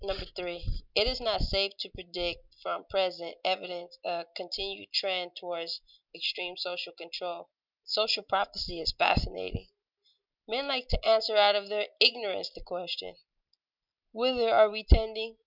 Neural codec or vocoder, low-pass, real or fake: none; 5.4 kHz; real